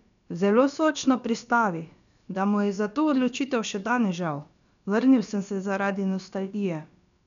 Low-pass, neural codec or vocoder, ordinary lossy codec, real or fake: 7.2 kHz; codec, 16 kHz, about 1 kbps, DyCAST, with the encoder's durations; none; fake